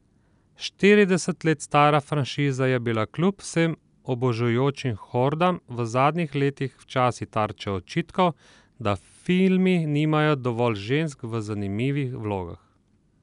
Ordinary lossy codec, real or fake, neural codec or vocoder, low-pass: none; real; none; 10.8 kHz